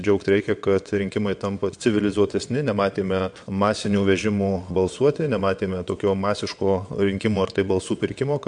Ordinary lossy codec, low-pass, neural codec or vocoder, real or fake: MP3, 64 kbps; 9.9 kHz; vocoder, 22.05 kHz, 80 mel bands, WaveNeXt; fake